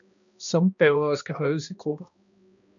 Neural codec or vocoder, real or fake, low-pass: codec, 16 kHz, 1 kbps, X-Codec, HuBERT features, trained on balanced general audio; fake; 7.2 kHz